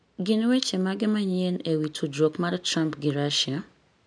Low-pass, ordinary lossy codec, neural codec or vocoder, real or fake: 9.9 kHz; none; none; real